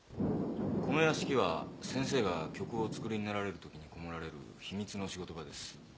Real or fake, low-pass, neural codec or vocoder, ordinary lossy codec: real; none; none; none